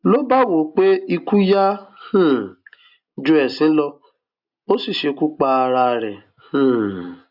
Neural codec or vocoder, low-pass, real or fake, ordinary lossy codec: none; 5.4 kHz; real; AAC, 48 kbps